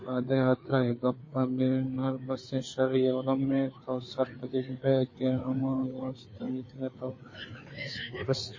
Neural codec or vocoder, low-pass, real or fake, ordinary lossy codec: codec, 16 kHz, 4 kbps, FreqCodec, larger model; 7.2 kHz; fake; MP3, 32 kbps